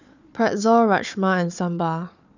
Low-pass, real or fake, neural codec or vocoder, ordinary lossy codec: 7.2 kHz; fake; codec, 16 kHz, 4 kbps, FunCodec, trained on Chinese and English, 50 frames a second; none